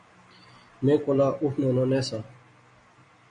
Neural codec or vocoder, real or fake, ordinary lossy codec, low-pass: none; real; MP3, 48 kbps; 9.9 kHz